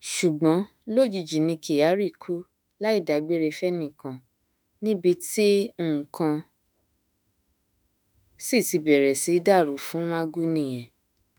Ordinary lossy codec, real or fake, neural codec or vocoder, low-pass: none; fake; autoencoder, 48 kHz, 32 numbers a frame, DAC-VAE, trained on Japanese speech; none